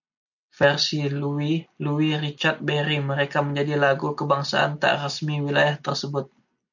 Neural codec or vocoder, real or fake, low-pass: none; real; 7.2 kHz